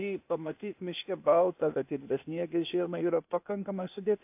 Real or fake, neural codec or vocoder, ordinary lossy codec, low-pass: fake; codec, 16 kHz, 0.8 kbps, ZipCodec; AAC, 32 kbps; 3.6 kHz